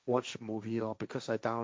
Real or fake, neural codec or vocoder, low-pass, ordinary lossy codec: fake; codec, 16 kHz, 1.1 kbps, Voila-Tokenizer; none; none